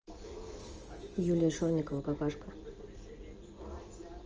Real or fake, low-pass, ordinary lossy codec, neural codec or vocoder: real; 7.2 kHz; Opus, 24 kbps; none